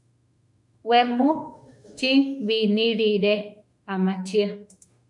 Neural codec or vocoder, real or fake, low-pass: autoencoder, 48 kHz, 32 numbers a frame, DAC-VAE, trained on Japanese speech; fake; 10.8 kHz